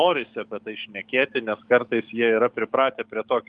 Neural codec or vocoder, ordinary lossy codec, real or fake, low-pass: codec, 44.1 kHz, 7.8 kbps, DAC; Opus, 32 kbps; fake; 9.9 kHz